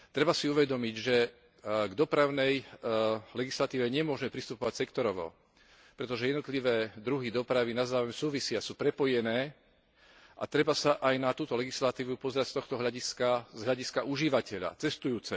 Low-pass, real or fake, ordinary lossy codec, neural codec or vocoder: none; real; none; none